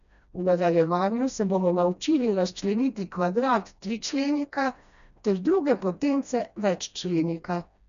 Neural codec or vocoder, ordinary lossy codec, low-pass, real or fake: codec, 16 kHz, 1 kbps, FreqCodec, smaller model; none; 7.2 kHz; fake